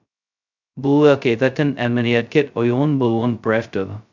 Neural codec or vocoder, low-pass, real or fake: codec, 16 kHz, 0.2 kbps, FocalCodec; 7.2 kHz; fake